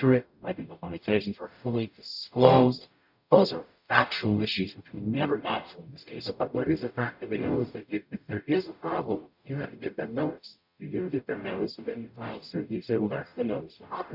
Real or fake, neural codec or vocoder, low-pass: fake; codec, 44.1 kHz, 0.9 kbps, DAC; 5.4 kHz